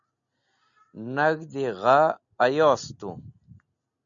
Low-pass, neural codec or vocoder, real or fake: 7.2 kHz; none; real